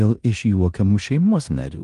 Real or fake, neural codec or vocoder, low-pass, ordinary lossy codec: fake; codec, 16 kHz in and 24 kHz out, 0.9 kbps, LongCat-Audio-Codec, four codebook decoder; 10.8 kHz; Opus, 24 kbps